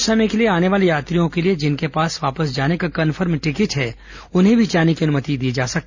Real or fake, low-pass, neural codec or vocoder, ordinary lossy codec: real; 7.2 kHz; none; Opus, 64 kbps